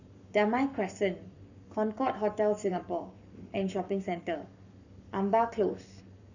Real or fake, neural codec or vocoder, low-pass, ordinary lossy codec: fake; codec, 44.1 kHz, 7.8 kbps, Pupu-Codec; 7.2 kHz; none